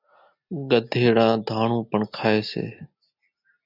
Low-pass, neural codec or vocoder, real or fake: 5.4 kHz; none; real